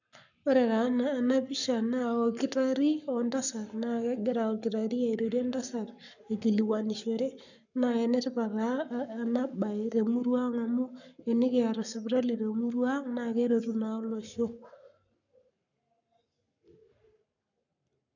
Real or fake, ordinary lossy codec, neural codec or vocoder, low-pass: fake; none; codec, 44.1 kHz, 7.8 kbps, Pupu-Codec; 7.2 kHz